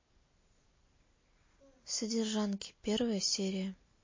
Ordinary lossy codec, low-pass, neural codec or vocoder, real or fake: MP3, 32 kbps; 7.2 kHz; none; real